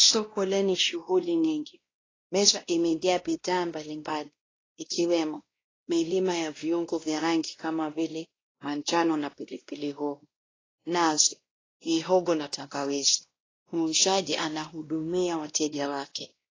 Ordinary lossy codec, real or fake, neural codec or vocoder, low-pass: AAC, 32 kbps; fake; codec, 16 kHz, 1 kbps, X-Codec, WavLM features, trained on Multilingual LibriSpeech; 7.2 kHz